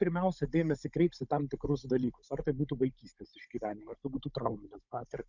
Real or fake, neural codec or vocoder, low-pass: fake; codec, 16 kHz, 16 kbps, FunCodec, trained on Chinese and English, 50 frames a second; 7.2 kHz